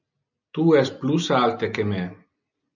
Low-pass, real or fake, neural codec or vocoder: 7.2 kHz; real; none